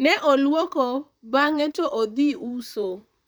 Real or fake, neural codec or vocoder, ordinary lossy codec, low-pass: fake; vocoder, 44.1 kHz, 128 mel bands, Pupu-Vocoder; none; none